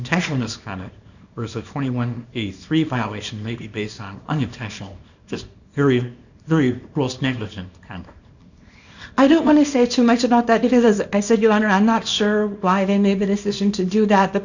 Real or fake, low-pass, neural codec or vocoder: fake; 7.2 kHz; codec, 24 kHz, 0.9 kbps, WavTokenizer, small release